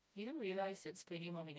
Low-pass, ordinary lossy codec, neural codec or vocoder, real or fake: none; none; codec, 16 kHz, 1 kbps, FreqCodec, smaller model; fake